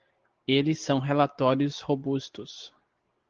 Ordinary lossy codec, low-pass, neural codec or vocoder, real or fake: Opus, 16 kbps; 7.2 kHz; none; real